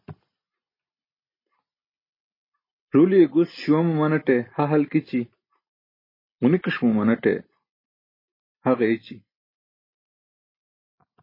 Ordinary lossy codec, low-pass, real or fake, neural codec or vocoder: MP3, 24 kbps; 5.4 kHz; real; none